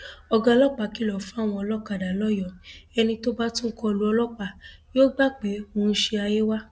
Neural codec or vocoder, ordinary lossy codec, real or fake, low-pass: none; none; real; none